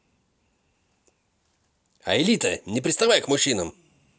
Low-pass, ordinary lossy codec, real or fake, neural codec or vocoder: none; none; real; none